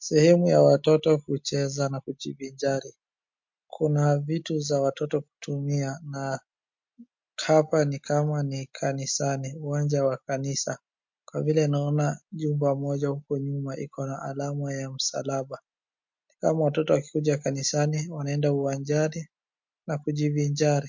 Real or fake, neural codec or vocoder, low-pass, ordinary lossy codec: real; none; 7.2 kHz; MP3, 48 kbps